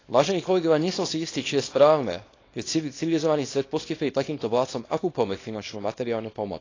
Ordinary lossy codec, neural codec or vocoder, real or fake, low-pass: AAC, 32 kbps; codec, 24 kHz, 0.9 kbps, WavTokenizer, small release; fake; 7.2 kHz